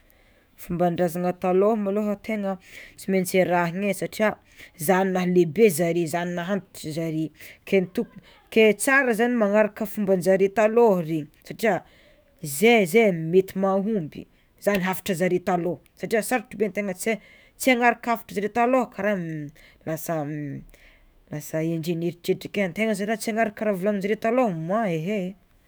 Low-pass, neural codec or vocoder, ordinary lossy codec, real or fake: none; autoencoder, 48 kHz, 128 numbers a frame, DAC-VAE, trained on Japanese speech; none; fake